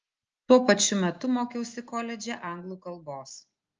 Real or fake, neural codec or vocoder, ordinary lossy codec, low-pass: real; none; Opus, 24 kbps; 7.2 kHz